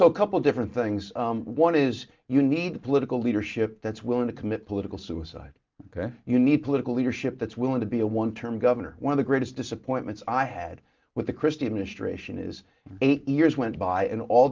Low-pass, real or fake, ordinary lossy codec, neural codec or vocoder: 7.2 kHz; real; Opus, 24 kbps; none